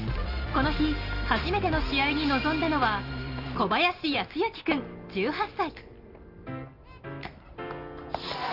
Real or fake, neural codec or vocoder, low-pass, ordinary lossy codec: real; none; 5.4 kHz; Opus, 32 kbps